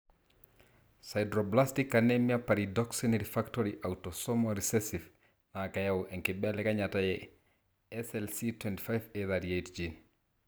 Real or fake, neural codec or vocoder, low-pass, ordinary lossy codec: real; none; none; none